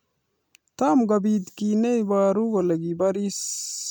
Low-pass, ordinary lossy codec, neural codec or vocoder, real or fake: none; none; none; real